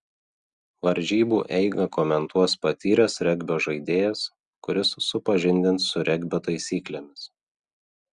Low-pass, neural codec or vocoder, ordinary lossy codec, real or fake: 10.8 kHz; none; Opus, 64 kbps; real